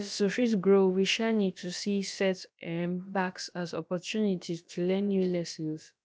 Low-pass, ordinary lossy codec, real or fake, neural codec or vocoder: none; none; fake; codec, 16 kHz, about 1 kbps, DyCAST, with the encoder's durations